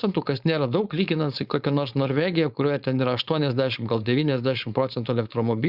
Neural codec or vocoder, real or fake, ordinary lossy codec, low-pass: codec, 16 kHz, 4.8 kbps, FACodec; fake; Opus, 64 kbps; 5.4 kHz